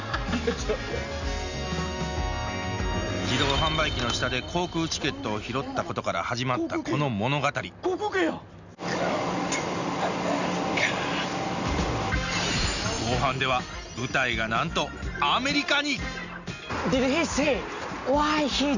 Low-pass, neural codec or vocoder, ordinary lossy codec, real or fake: 7.2 kHz; none; none; real